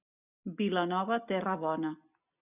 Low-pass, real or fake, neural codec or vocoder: 3.6 kHz; real; none